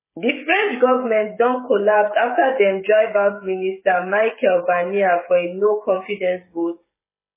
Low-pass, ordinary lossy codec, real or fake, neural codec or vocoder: 3.6 kHz; MP3, 16 kbps; fake; codec, 16 kHz, 16 kbps, FreqCodec, smaller model